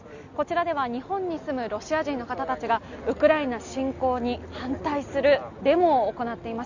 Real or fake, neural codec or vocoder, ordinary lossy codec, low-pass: real; none; none; 7.2 kHz